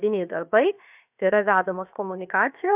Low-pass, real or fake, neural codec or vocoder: 3.6 kHz; fake; codec, 16 kHz, 0.7 kbps, FocalCodec